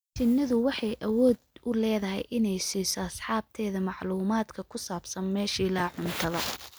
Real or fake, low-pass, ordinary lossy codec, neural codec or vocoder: real; none; none; none